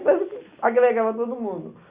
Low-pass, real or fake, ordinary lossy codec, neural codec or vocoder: 3.6 kHz; real; Opus, 64 kbps; none